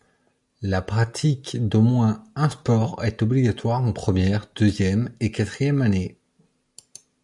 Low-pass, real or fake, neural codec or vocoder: 10.8 kHz; real; none